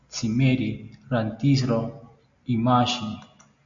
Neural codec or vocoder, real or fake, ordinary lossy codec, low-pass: none; real; MP3, 64 kbps; 7.2 kHz